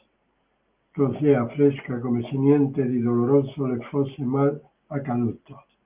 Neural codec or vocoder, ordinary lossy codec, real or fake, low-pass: none; Opus, 32 kbps; real; 3.6 kHz